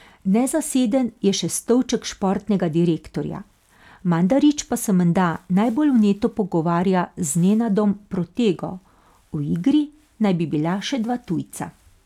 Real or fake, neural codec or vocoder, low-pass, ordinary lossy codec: real; none; 19.8 kHz; none